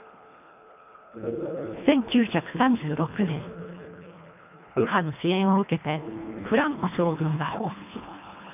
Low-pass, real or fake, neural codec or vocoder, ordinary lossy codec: 3.6 kHz; fake; codec, 24 kHz, 1.5 kbps, HILCodec; none